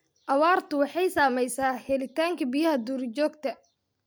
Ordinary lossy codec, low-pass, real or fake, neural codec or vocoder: none; none; real; none